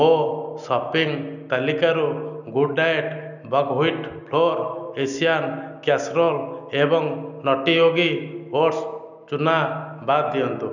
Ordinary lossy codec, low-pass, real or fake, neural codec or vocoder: none; 7.2 kHz; real; none